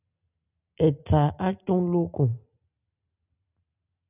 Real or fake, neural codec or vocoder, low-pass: real; none; 3.6 kHz